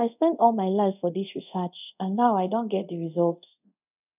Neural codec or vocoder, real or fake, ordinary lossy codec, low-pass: codec, 24 kHz, 0.5 kbps, DualCodec; fake; none; 3.6 kHz